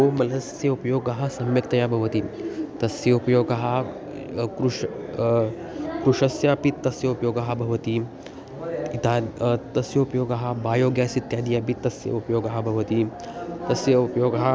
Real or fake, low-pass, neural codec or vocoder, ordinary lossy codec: real; none; none; none